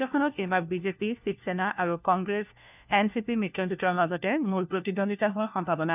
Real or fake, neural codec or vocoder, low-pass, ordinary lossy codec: fake; codec, 16 kHz, 1 kbps, FunCodec, trained on LibriTTS, 50 frames a second; 3.6 kHz; none